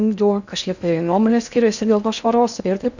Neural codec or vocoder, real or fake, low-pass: codec, 16 kHz in and 24 kHz out, 0.6 kbps, FocalCodec, streaming, 4096 codes; fake; 7.2 kHz